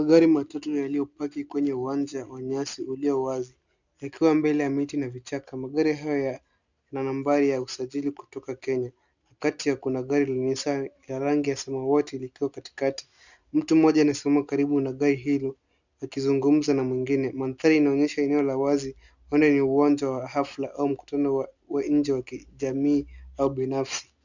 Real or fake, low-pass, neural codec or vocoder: real; 7.2 kHz; none